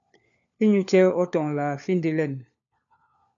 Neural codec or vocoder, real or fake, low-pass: codec, 16 kHz, 4 kbps, FunCodec, trained on LibriTTS, 50 frames a second; fake; 7.2 kHz